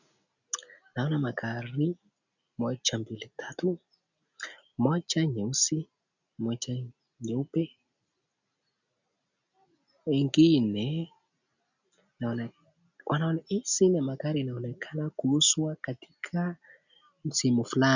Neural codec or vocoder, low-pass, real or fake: none; 7.2 kHz; real